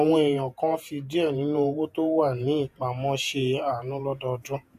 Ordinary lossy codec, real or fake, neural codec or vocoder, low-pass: none; fake; vocoder, 48 kHz, 128 mel bands, Vocos; 14.4 kHz